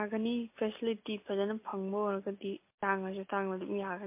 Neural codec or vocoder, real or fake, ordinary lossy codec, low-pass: none; real; MP3, 24 kbps; 3.6 kHz